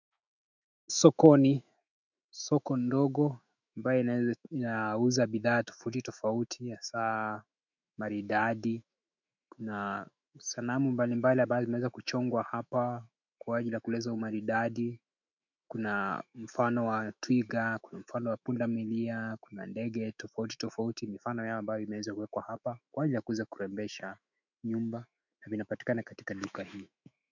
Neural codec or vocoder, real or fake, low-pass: autoencoder, 48 kHz, 128 numbers a frame, DAC-VAE, trained on Japanese speech; fake; 7.2 kHz